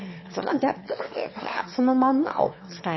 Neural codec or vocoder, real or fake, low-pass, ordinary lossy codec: autoencoder, 22.05 kHz, a latent of 192 numbers a frame, VITS, trained on one speaker; fake; 7.2 kHz; MP3, 24 kbps